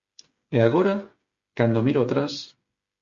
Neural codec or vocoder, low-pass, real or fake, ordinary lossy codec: codec, 16 kHz, 8 kbps, FreqCodec, smaller model; 7.2 kHz; fake; Opus, 64 kbps